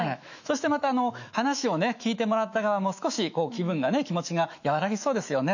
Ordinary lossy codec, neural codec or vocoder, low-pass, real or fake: none; autoencoder, 48 kHz, 128 numbers a frame, DAC-VAE, trained on Japanese speech; 7.2 kHz; fake